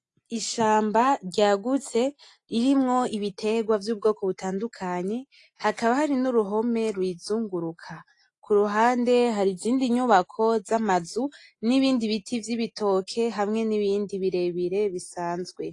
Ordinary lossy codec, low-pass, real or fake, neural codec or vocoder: AAC, 48 kbps; 10.8 kHz; real; none